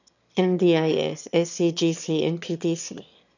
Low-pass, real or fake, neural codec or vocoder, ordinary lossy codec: 7.2 kHz; fake; autoencoder, 22.05 kHz, a latent of 192 numbers a frame, VITS, trained on one speaker; none